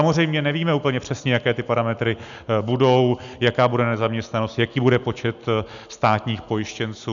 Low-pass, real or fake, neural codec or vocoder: 7.2 kHz; real; none